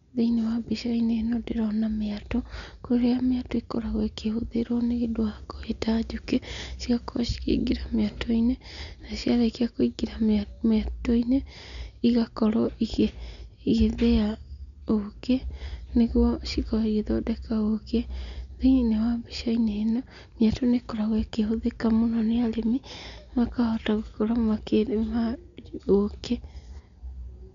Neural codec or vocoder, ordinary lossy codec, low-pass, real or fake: none; none; 7.2 kHz; real